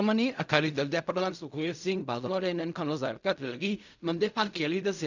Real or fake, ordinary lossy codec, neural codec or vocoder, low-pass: fake; none; codec, 16 kHz in and 24 kHz out, 0.4 kbps, LongCat-Audio-Codec, fine tuned four codebook decoder; 7.2 kHz